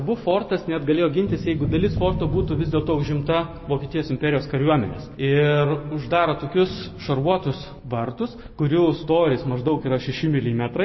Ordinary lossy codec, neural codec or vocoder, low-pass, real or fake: MP3, 24 kbps; none; 7.2 kHz; real